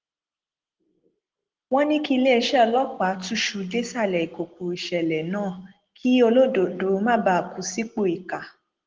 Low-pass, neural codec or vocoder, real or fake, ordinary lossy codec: 7.2 kHz; none; real; Opus, 16 kbps